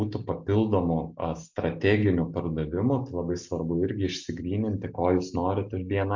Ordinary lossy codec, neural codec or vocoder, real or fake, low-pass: MP3, 48 kbps; none; real; 7.2 kHz